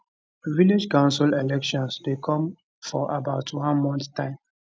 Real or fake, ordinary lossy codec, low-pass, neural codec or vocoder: real; none; none; none